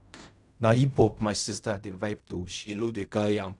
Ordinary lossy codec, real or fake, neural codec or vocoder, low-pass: none; fake; codec, 16 kHz in and 24 kHz out, 0.4 kbps, LongCat-Audio-Codec, fine tuned four codebook decoder; 10.8 kHz